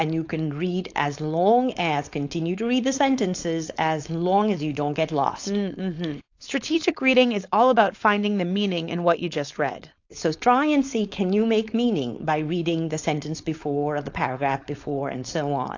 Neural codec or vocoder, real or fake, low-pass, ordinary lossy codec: codec, 16 kHz, 4.8 kbps, FACodec; fake; 7.2 kHz; AAC, 48 kbps